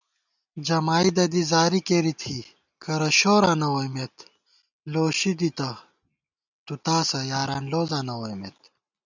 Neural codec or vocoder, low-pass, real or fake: none; 7.2 kHz; real